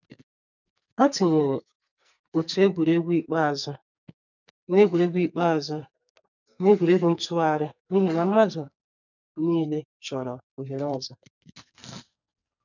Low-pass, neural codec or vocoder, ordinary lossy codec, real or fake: 7.2 kHz; codec, 44.1 kHz, 2.6 kbps, SNAC; none; fake